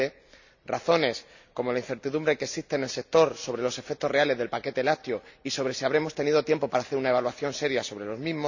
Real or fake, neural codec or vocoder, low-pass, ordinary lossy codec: real; none; 7.2 kHz; none